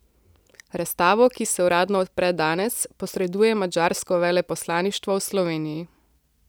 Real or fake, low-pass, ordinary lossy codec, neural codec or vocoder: real; none; none; none